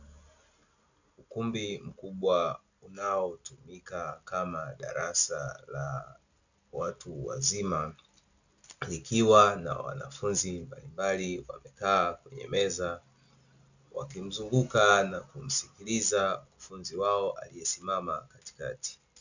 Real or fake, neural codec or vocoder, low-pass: real; none; 7.2 kHz